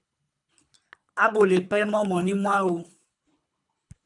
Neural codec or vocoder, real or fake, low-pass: codec, 24 kHz, 3 kbps, HILCodec; fake; 10.8 kHz